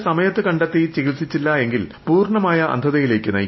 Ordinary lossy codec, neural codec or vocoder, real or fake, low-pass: MP3, 24 kbps; none; real; 7.2 kHz